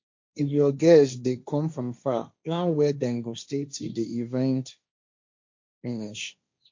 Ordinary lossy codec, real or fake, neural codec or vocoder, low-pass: MP3, 48 kbps; fake; codec, 16 kHz, 1.1 kbps, Voila-Tokenizer; 7.2 kHz